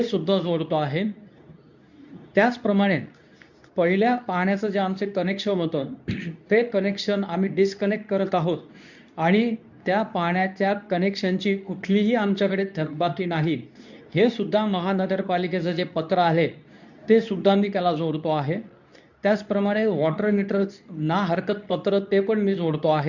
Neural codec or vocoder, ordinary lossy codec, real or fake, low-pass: codec, 24 kHz, 0.9 kbps, WavTokenizer, medium speech release version 2; none; fake; 7.2 kHz